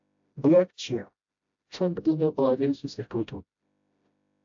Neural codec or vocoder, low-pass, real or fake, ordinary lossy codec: codec, 16 kHz, 0.5 kbps, FreqCodec, smaller model; 7.2 kHz; fake; AAC, 64 kbps